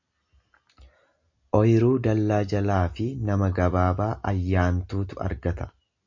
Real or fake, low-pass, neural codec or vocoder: real; 7.2 kHz; none